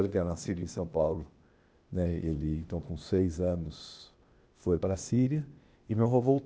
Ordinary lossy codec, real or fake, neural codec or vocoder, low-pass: none; fake; codec, 16 kHz, 0.8 kbps, ZipCodec; none